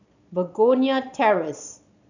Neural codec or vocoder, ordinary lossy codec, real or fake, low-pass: vocoder, 22.05 kHz, 80 mel bands, Vocos; none; fake; 7.2 kHz